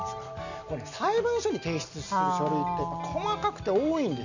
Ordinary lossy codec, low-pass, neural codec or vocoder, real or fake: none; 7.2 kHz; none; real